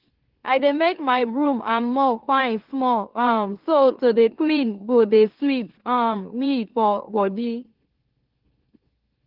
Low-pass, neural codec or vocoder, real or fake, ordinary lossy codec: 5.4 kHz; autoencoder, 44.1 kHz, a latent of 192 numbers a frame, MeloTTS; fake; Opus, 16 kbps